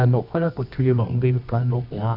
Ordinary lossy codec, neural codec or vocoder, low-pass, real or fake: none; codec, 24 kHz, 0.9 kbps, WavTokenizer, medium music audio release; 5.4 kHz; fake